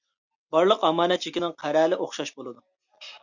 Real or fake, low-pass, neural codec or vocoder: real; 7.2 kHz; none